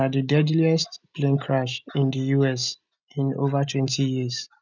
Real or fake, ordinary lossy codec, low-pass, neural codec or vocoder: real; none; 7.2 kHz; none